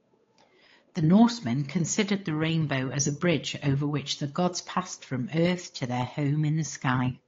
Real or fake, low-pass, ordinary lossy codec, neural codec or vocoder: fake; 7.2 kHz; AAC, 32 kbps; codec, 16 kHz, 8 kbps, FunCodec, trained on Chinese and English, 25 frames a second